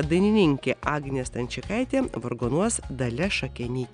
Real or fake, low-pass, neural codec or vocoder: real; 9.9 kHz; none